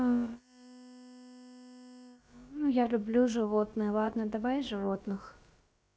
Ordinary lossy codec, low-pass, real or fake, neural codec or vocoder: none; none; fake; codec, 16 kHz, about 1 kbps, DyCAST, with the encoder's durations